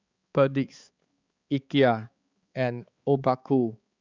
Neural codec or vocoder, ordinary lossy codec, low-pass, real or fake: codec, 16 kHz, 4 kbps, X-Codec, HuBERT features, trained on balanced general audio; Opus, 64 kbps; 7.2 kHz; fake